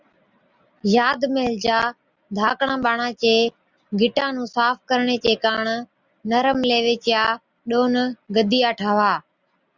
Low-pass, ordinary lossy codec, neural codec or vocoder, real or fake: 7.2 kHz; Opus, 64 kbps; none; real